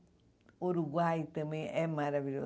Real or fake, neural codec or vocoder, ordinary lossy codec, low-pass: real; none; none; none